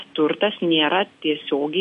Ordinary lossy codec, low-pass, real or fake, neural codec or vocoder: MP3, 48 kbps; 14.4 kHz; real; none